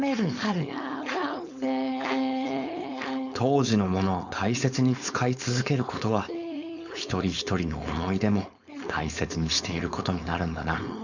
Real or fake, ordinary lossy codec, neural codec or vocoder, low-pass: fake; none; codec, 16 kHz, 4.8 kbps, FACodec; 7.2 kHz